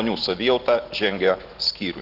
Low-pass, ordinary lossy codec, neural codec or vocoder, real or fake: 5.4 kHz; Opus, 16 kbps; none; real